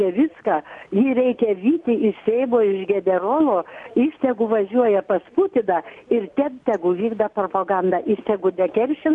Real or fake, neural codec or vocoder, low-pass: real; none; 10.8 kHz